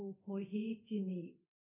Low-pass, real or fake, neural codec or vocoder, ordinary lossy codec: 3.6 kHz; fake; codec, 24 kHz, 0.9 kbps, DualCodec; none